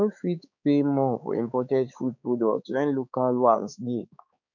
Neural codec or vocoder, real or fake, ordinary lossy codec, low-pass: codec, 16 kHz, 4 kbps, X-Codec, HuBERT features, trained on balanced general audio; fake; none; 7.2 kHz